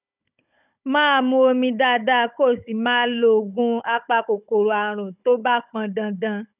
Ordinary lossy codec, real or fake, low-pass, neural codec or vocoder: none; fake; 3.6 kHz; codec, 16 kHz, 16 kbps, FunCodec, trained on Chinese and English, 50 frames a second